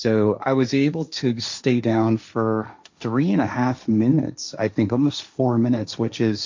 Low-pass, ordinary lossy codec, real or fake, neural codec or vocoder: 7.2 kHz; MP3, 64 kbps; fake; codec, 16 kHz, 1.1 kbps, Voila-Tokenizer